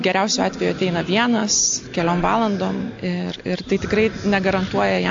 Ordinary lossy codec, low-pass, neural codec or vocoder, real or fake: AAC, 48 kbps; 7.2 kHz; none; real